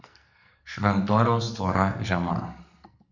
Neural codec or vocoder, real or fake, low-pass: codec, 44.1 kHz, 2.6 kbps, SNAC; fake; 7.2 kHz